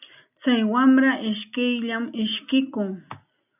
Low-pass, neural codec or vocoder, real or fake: 3.6 kHz; none; real